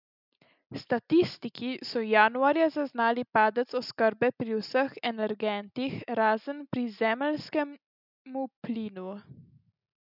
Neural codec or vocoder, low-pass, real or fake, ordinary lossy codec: none; 5.4 kHz; real; none